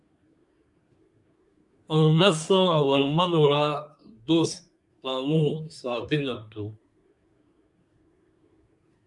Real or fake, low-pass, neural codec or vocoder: fake; 10.8 kHz; codec, 24 kHz, 1 kbps, SNAC